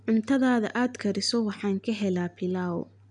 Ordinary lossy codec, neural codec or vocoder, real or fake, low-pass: none; none; real; 9.9 kHz